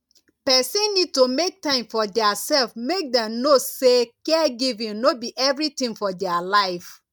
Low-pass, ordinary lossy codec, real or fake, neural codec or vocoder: 19.8 kHz; none; real; none